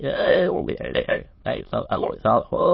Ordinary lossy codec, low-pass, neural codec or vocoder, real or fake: MP3, 24 kbps; 7.2 kHz; autoencoder, 22.05 kHz, a latent of 192 numbers a frame, VITS, trained on many speakers; fake